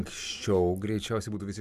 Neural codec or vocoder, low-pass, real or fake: none; 14.4 kHz; real